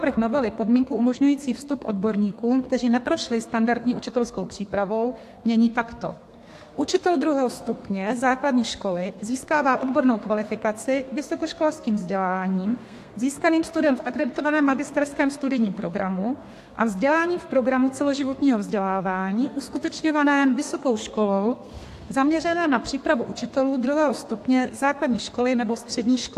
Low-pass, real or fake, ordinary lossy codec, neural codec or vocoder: 14.4 kHz; fake; AAC, 64 kbps; codec, 32 kHz, 1.9 kbps, SNAC